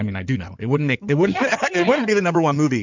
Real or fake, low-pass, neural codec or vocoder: fake; 7.2 kHz; codec, 16 kHz in and 24 kHz out, 2.2 kbps, FireRedTTS-2 codec